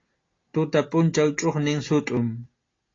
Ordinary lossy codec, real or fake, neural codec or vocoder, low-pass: AAC, 32 kbps; real; none; 7.2 kHz